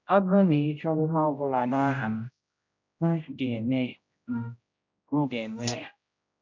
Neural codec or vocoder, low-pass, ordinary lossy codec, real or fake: codec, 16 kHz, 0.5 kbps, X-Codec, HuBERT features, trained on general audio; 7.2 kHz; MP3, 64 kbps; fake